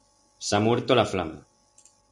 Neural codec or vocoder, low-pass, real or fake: none; 10.8 kHz; real